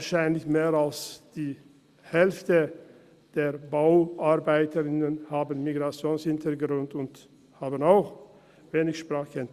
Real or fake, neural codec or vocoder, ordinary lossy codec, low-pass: real; none; Opus, 64 kbps; 14.4 kHz